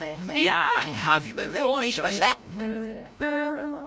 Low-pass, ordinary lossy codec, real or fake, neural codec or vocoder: none; none; fake; codec, 16 kHz, 0.5 kbps, FreqCodec, larger model